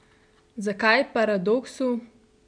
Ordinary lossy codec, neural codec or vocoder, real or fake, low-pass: none; none; real; 9.9 kHz